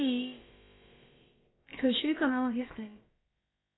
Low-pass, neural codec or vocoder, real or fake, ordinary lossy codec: 7.2 kHz; codec, 16 kHz, about 1 kbps, DyCAST, with the encoder's durations; fake; AAC, 16 kbps